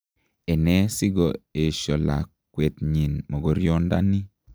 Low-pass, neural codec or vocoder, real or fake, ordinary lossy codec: none; none; real; none